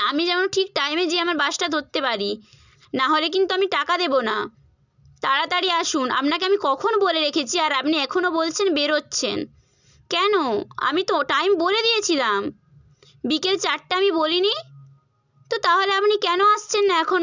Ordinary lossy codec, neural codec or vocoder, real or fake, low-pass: none; none; real; 7.2 kHz